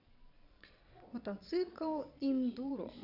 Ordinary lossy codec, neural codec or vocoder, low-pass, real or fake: none; vocoder, 22.05 kHz, 80 mel bands, Vocos; 5.4 kHz; fake